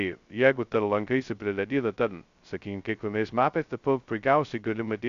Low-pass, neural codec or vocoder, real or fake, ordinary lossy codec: 7.2 kHz; codec, 16 kHz, 0.2 kbps, FocalCodec; fake; Opus, 64 kbps